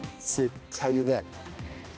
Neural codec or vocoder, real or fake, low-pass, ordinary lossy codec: codec, 16 kHz, 1 kbps, X-Codec, HuBERT features, trained on balanced general audio; fake; none; none